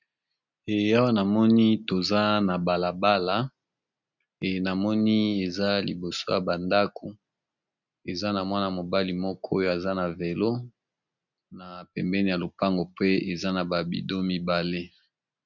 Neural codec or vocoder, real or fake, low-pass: none; real; 7.2 kHz